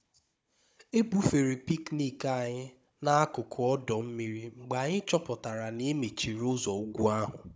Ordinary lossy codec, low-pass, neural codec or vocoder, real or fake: none; none; codec, 16 kHz, 16 kbps, FunCodec, trained on Chinese and English, 50 frames a second; fake